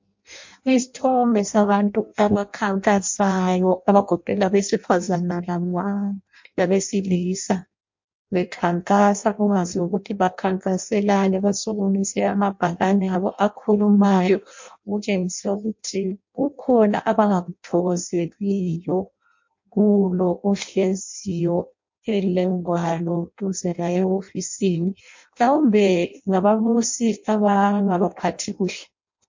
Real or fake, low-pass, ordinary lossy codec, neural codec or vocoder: fake; 7.2 kHz; MP3, 48 kbps; codec, 16 kHz in and 24 kHz out, 0.6 kbps, FireRedTTS-2 codec